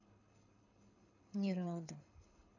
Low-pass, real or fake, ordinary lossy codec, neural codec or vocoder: 7.2 kHz; fake; none; codec, 24 kHz, 6 kbps, HILCodec